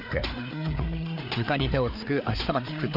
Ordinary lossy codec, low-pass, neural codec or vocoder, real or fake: none; 5.4 kHz; codec, 16 kHz, 4 kbps, FreqCodec, larger model; fake